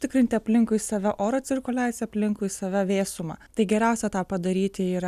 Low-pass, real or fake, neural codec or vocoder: 14.4 kHz; real; none